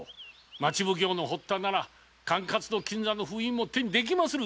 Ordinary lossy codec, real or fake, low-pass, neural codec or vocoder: none; real; none; none